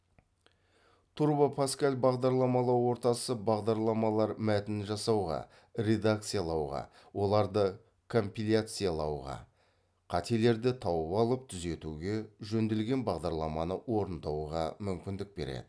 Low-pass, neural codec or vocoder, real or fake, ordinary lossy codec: none; none; real; none